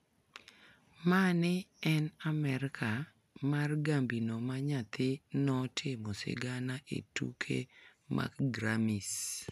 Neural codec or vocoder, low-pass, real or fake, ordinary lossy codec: none; 14.4 kHz; real; none